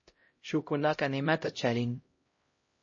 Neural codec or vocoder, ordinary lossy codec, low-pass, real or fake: codec, 16 kHz, 0.5 kbps, X-Codec, HuBERT features, trained on LibriSpeech; MP3, 32 kbps; 7.2 kHz; fake